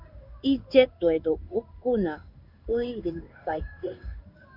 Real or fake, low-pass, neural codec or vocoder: fake; 5.4 kHz; codec, 16 kHz in and 24 kHz out, 1 kbps, XY-Tokenizer